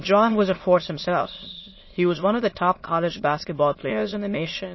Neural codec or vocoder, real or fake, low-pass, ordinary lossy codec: autoencoder, 22.05 kHz, a latent of 192 numbers a frame, VITS, trained on many speakers; fake; 7.2 kHz; MP3, 24 kbps